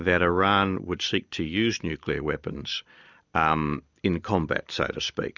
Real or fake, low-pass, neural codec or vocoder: real; 7.2 kHz; none